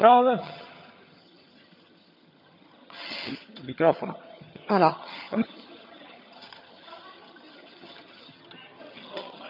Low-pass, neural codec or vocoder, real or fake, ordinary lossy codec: 5.4 kHz; vocoder, 22.05 kHz, 80 mel bands, HiFi-GAN; fake; none